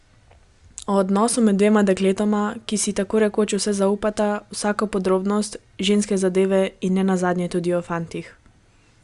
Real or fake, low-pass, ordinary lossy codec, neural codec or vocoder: real; 10.8 kHz; Opus, 64 kbps; none